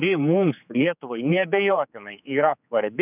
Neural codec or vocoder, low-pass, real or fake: codec, 16 kHz, 4 kbps, X-Codec, HuBERT features, trained on general audio; 3.6 kHz; fake